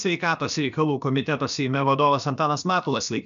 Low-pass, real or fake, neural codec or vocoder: 7.2 kHz; fake; codec, 16 kHz, about 1 kbps, DyCAST, with the encoder's durations